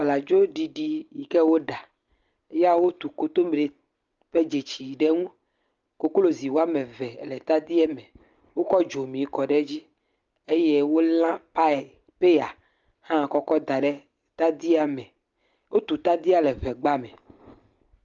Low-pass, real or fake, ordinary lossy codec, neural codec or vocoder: 7.2 kHz; real; Opus, 32 kbps; none